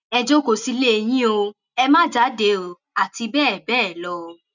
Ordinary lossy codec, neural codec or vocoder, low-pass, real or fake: none; none; 7.2 kHz; real